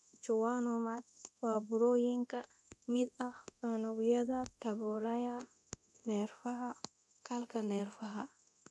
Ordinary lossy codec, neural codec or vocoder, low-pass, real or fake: none; codec, 24 kHz, 0.9 kbps, DualCodec; none; fake